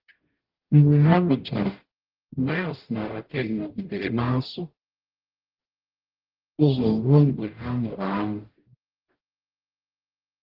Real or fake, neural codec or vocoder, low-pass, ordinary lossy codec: fake; codec, 44.1 kHz, 0.9 kbps, DAC; 5.4 kHz; Opus, 16 kbps